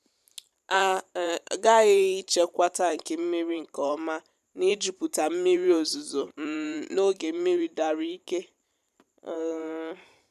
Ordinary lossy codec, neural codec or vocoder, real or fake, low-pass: none; vocoder, 44.1 kHz, 128 mel bands every 512 samples, BigVGAN v2; fake; 14.4 kHz